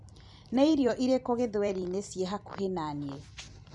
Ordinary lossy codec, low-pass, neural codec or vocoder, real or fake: none; 10.8 kHz; vocoder, 44.1 kHz, 128 mel bands every 256 samples, BigVGAN v2; fake